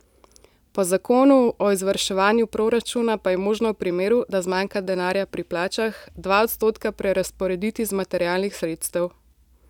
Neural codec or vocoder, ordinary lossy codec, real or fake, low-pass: none; none; real; 19.8 kHz